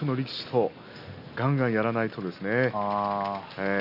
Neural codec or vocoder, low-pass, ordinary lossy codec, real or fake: none; 5.4 kHz; none; real